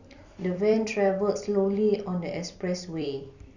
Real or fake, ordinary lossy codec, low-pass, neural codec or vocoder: fake; none; 7.2 kHz; vocoder, 44.1 kHz, 128 mel bands every 256 samples, BigVGAN v2